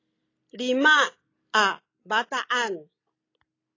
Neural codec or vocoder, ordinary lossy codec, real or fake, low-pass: none; AAC, 32 kbps; real; 7.2 kHz